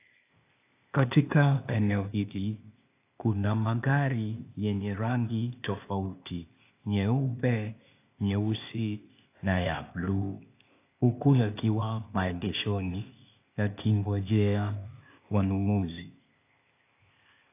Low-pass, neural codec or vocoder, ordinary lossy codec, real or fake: 3.6 kHz; codec, 16 kHz, 0.8 kbps, ZipCodec; AAC, 24 kbps; fake